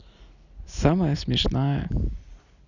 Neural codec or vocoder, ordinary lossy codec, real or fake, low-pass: none; none; real; 7.2 kHz